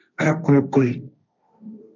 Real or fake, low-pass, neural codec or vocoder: fake; 7.2 kHz; codec, 16 kHz, 1.1 kbps, Voila-Tokenizer